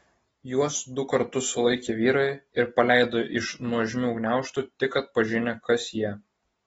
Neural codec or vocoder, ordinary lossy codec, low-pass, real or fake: none; AAC, 24 kbps; 19.8 kHz; real